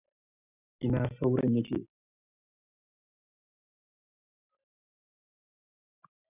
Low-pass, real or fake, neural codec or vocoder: 3.6 kHz; real; none